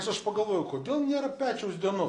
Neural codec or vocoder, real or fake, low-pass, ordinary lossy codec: none; real; 10.8 kHz; AAC, 32 kbps